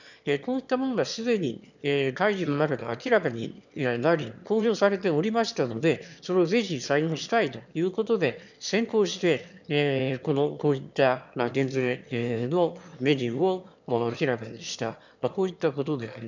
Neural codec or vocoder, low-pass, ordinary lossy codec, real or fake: autoencoder, 22.05 kHz, a latent of 192 numbers a frame, VITS, trained on one speaker; 7.2 kHz; none; fake